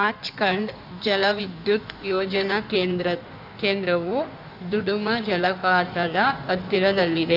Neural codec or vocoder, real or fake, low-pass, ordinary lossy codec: codec, 16 kHz in and 24 kHz out, 1.1 kbps, FireRedTTS-2 codec; fake; 5.4 kHz; none